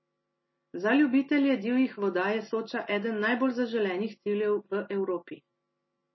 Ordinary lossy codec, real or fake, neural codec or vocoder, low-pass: MP3, 24 kbps; real; none; 7.2 kHz